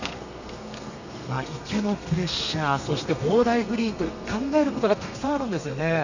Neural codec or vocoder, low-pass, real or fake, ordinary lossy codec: codec, 32 kHz, 1.9 kbps, SNAC; 7.2 kHz; fake; none